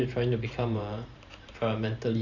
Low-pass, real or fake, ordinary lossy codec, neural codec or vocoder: 7.2 kHz; fake; none; vocoder, 44.1 kHz, 128 mel bands every 512 samples, BigVGAN v2